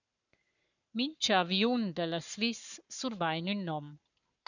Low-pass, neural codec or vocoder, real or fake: 7.2 kHz; codec, 44.1 kHz, 7.8 kbps, Pupu-Codec; fake